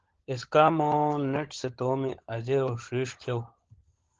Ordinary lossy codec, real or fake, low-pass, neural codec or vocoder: Opus, 16 kbps; fake; 7.2 kHz; codec, 16 kHz, 16 kbps, FunCodec, trained on LibriTTS, 50 frames a second